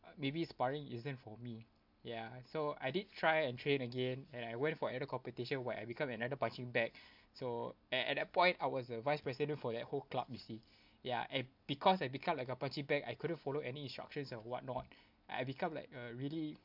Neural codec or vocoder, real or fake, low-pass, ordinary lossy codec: none; real; 5.4 kHz; MP3, 48 kbps